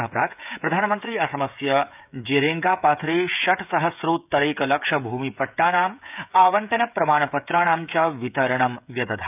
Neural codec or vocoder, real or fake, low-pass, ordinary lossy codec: codec, 16 kHz, 16 kbps, FreqCodec, smaller model; fake; 3.6 kHz; none